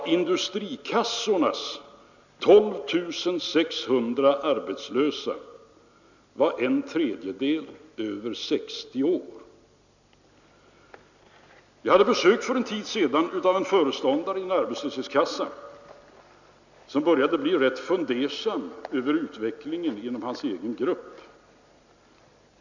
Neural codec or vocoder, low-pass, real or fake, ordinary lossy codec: none; 7.2 kHz; real; none